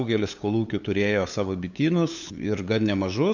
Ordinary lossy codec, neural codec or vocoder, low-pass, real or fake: MP3, 64 kbps; codec, 16 kHz, 8 kbps, FunCodec, trained on LibriTTS, 25 frames a second; 7.2 kHz; fake